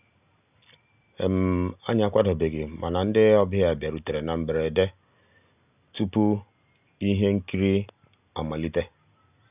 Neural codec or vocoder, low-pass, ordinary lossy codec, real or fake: none; 3.6 kHz; none; real